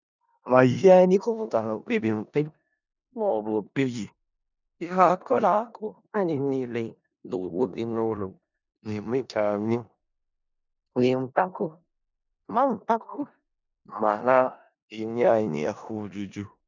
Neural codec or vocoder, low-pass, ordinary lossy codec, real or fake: codec, 16 kHz in and 24 kHz out, 0.4 kbps, LongCat-Audio-Codec, four codebook decoder; 7.2 kHz; none; fake